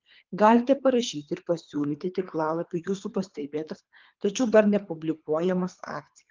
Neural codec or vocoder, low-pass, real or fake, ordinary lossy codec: codec, 24 kHz, 3 kbps, HILCodec; 7.2 kHz; fake; Opus, 32 kbps